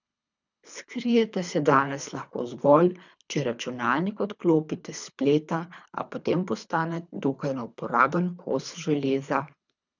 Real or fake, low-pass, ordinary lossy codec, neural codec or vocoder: fake; 7.2 kHz; none; codec, 24 kHz, 3 kbps, HILCodec